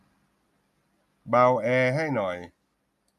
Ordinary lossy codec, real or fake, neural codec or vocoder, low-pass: none; real; none; 14.4 kHz